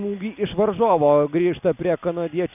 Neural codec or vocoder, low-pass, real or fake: none; 3.6 kHz; real